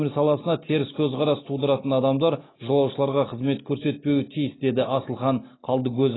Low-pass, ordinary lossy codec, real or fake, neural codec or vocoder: 7.2 kHz; AAC, 16 kbps; real; none